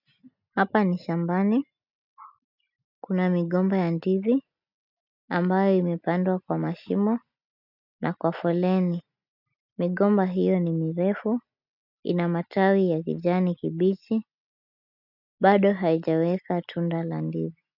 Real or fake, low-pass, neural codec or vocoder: real; 5.4 kHz; none